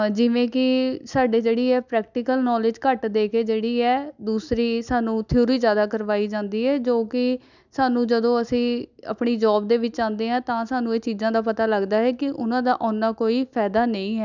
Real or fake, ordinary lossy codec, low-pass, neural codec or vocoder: real; none; 7.2 kHz; none